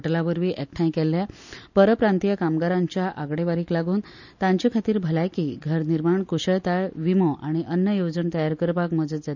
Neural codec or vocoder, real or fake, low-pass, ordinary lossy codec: none; real; 7.2 kHz; none